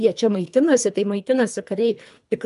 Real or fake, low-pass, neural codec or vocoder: fake; 10.8 kHz; codec, 24 kHz, 3 kbps, HILCodec